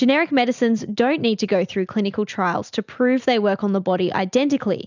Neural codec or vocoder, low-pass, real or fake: none; 7.2 kHz; real